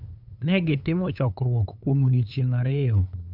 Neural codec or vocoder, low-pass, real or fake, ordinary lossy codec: codec, 16 kHz, 8 kbps, FunCodec, trained on LibriTTS, 25 frames a second; 5.4 kHz; fake; none